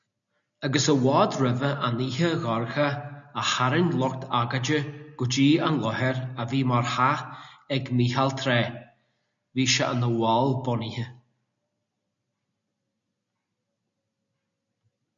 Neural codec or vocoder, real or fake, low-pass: none; real; 7.2 kHz